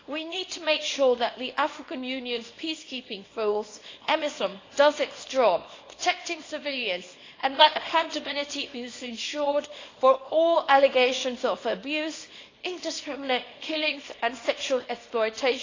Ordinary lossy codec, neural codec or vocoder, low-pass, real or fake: AAC, 32 kbps; codec, 24 kHz, 0.9 kbps, WavTokenizer, small release; 7.2 kHz; fake